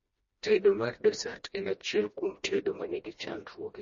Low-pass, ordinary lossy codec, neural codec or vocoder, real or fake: 7.2 kHz; MP3, 32 kbps; codec, 16 kHz, 1 kbps, FreqCodec, smaller model; fake